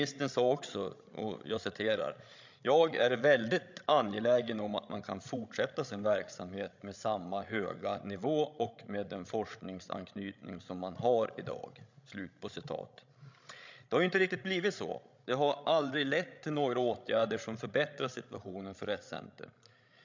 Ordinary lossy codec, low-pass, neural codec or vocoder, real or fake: MP3, 64 kbps; 7.2 kHz; codec, 16 kHz, 16 kbps, FreqCodec, larger model; fake